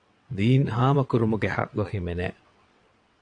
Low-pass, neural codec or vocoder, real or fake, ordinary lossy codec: 9.9 kHz; vocoder, 22.05 kHz, 80 mel bands, WaveNeXt; fake; AAC, 48 kbps